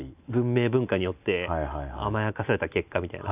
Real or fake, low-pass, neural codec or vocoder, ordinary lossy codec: real; 3.6 kHz; none; none